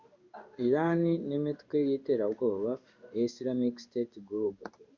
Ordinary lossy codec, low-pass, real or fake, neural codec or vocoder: Opus, 64 kbps; 7.2 kHz; fake; codec, 16 kHz in and 24 kHz out, 1 kbps, XY-Tokenizer